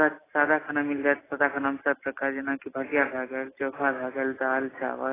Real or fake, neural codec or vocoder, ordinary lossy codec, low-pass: real; none; AAC, 16 kbps; 3.6 kHz